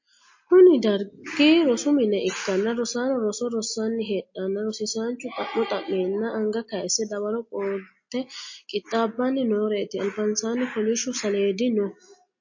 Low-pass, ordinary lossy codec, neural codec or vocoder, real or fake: 7.2 kHz; MP3, 32 kbps; none; real